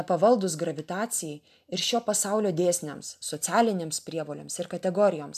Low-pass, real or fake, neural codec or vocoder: 14.4 kHz; real; none